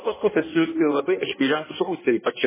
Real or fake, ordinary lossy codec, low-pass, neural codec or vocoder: fake; MP3, 16 kbps; 3.6 kHz; codec, 16 kHz in and 24 kHz out, 1.1 kbps, FireRedTTS-2 codec